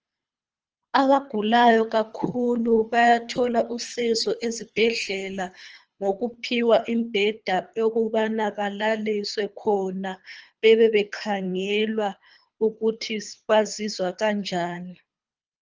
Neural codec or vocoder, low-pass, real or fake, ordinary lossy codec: codec, 24 kHz, 3 kbps, HILCodec; 7.2 kHz; fake; Opus, 24 kbps